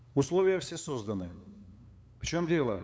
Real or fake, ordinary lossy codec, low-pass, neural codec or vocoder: fake; none; none; codec, 16 kHz, 8 kbps, FunCodec, trained on LibriTTS, 25 frames a second